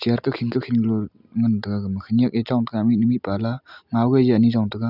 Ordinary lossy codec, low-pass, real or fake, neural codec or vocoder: none; 5.4 kHz; real; none